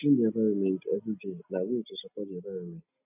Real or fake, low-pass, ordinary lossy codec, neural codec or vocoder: real; 3.6 kHz; none; none